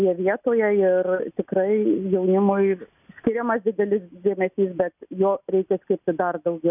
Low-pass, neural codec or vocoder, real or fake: 3.6 kHz; none; real